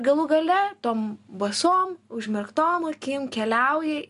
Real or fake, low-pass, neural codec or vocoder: real; 10.8 kHz; none